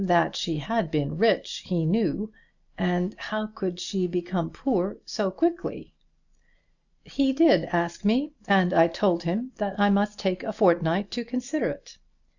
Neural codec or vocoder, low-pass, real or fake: none; 7.2 kHz; real